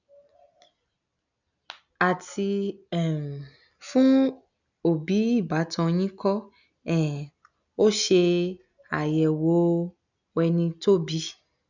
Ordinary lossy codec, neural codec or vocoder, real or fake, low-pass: none; none; real; 7.2 kHz